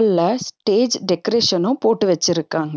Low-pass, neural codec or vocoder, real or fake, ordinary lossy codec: none; none; real; none